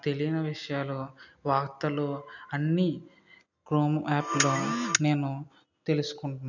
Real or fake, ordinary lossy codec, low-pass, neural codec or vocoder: real; none; 7.2 kHz; none